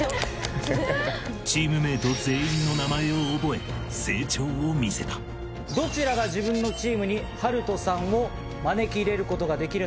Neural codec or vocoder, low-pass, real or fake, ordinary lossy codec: none; none; real; none